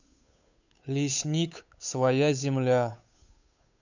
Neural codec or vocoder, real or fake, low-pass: codec, 16 kHz, 8 kbps, FunCodec, trained on Chinese and English, 25 frames a second; fake; 7.2 kHz